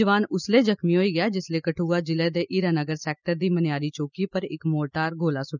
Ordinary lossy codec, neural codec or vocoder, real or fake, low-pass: none; none; real; 7.2 kHz